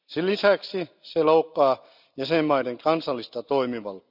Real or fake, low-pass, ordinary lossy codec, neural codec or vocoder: real; 5.4 kHz; none; none